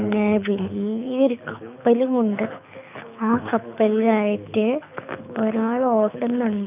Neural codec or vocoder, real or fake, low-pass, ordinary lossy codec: codec, 24 kHz, 6 kbps, HILCodec; fake; 3.6 kHz; none